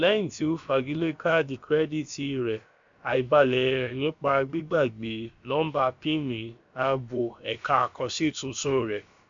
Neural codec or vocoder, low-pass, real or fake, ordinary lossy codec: codec, 16 kHz, about 1 kbps, DyCAST, with the encoder's durations; 7.2 kHz; fake; MP3, 64 kbps